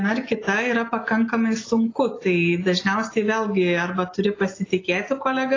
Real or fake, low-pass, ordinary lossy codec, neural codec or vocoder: real; 7.2 kHz; AAC, 32 kbps; none